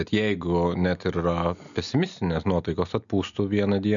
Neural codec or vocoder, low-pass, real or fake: none; 7.2 kHz; real